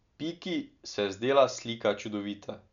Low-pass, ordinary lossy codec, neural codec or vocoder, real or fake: 7.2 kHz; none; none; real